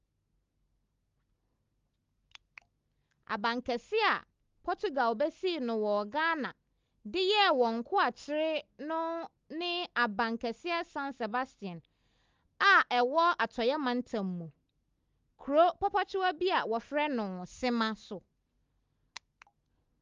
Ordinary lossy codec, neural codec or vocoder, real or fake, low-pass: Opus, 32 kbps; none; real; 7.2 kHz